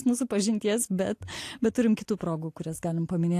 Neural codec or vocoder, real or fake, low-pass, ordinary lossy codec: none; real; 14.4 kHz; AAC, 64 kbps